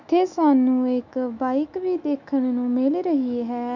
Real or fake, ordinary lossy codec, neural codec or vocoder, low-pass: fake; none; autoencoder, 48 kHz, 128 numbers a frame, DAC-VAE, trained on Japanese speech; 7.2 kHz